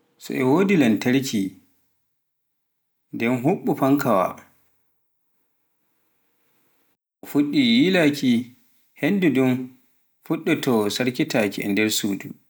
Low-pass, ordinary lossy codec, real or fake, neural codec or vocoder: none; none; real; none